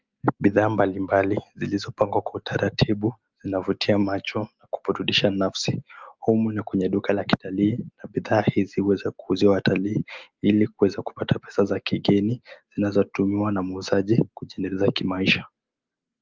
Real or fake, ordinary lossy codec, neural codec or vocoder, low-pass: real; Opus, 32 kbps; none; 7.2 kHz